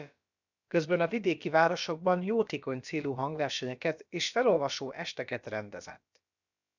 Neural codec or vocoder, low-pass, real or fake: codec, 16 kHz, about 1 kbps, DyCAST, with the encoder's durations; 7.2 kHz; fake